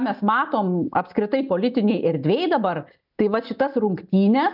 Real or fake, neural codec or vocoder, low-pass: real; none; 5.4 kHz